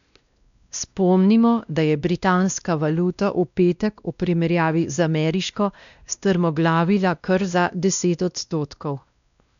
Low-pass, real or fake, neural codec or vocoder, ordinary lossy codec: 7.2 kHz; fake; codec, 16 kHz, 1 kbps, X-Codec, WavLM features, trained on Multilingual LibriSpeech; none